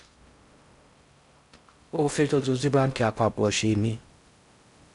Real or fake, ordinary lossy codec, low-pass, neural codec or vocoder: fake; none; 10.8 kHz; codec, 16 kHz in and 24 kHz out, 0.6 kbps, FocalCodec, streaming, 4096 codes